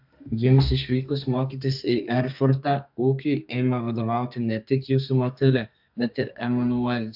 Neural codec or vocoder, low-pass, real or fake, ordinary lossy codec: codec, 32 kHz, 1.9 kbps, SNAC; 5.4 kHz; fake; AAC, 48 kbps